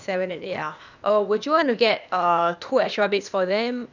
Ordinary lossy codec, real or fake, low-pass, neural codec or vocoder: none; fake; 7.2 kHz; codec, 16 kHz, 0.8 kbps, ZipCodec